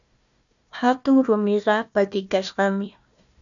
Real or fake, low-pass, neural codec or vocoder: fake; 7.2 kHz; codec, 16 kHz, 1 kbps, FunCodec, trained on Chinese and English, 50 frames a second